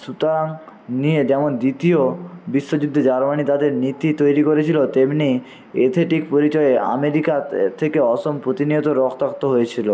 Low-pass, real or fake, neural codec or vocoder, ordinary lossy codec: none; real; none; none